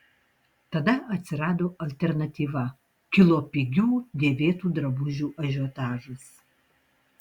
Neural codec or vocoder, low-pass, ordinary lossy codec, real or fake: none; 19.8 kHz; Opus, 64 kbps; real